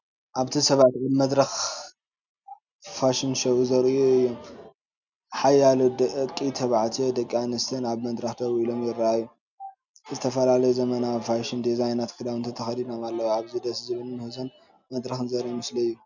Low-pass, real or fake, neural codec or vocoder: 7.2 kHz; real; none